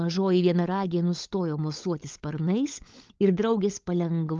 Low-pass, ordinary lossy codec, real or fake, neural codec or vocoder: 7.2 kHz; Opus, 24 kbps; fake; codec, 16 kHz, 16 kbps, FunCodec, trained on LibriTTS, 50 frames a second